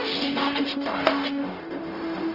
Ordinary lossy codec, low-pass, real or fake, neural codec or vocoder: Opus, 24 kbps; 5.4 kHz; fake; codec, 44.1 kHz, 0.9 kbps, DAC